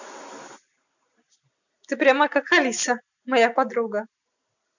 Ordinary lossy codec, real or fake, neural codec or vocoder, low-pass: none; real; none; 7.2 kHz